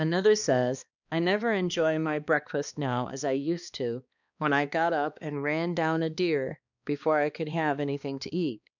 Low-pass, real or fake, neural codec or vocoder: 7.2 kHz; fake; codec, 16 kHz, 2 kbps, X-Codec, HuBERT features, trained on balanced general audio